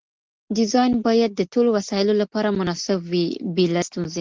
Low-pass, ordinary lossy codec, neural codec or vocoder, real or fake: 7.2 kHz; Opus, 16 kbps; none; real